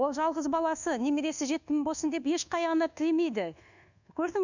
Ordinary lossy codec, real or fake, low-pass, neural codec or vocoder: none; fake; 7.2 kHz; codec, 24 kHz, 1.2 kbps, DualCodec